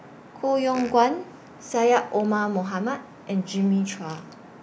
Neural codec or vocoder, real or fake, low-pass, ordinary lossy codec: none; real; none; none